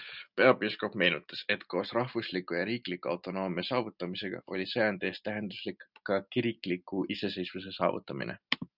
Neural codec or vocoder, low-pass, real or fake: none; 5.4 kHz; real